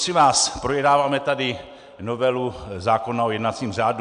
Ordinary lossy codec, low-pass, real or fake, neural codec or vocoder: MP3, 96 kbps; 9.9 kHz; real; none